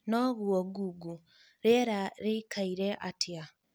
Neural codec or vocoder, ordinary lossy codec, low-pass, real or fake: none; none; none; real